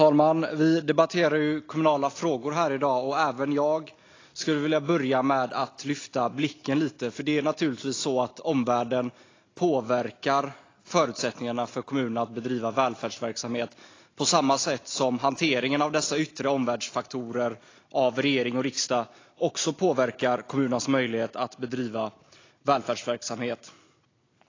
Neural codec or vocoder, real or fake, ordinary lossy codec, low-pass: none; real; AAC, 32 kbps; 7.2 kHz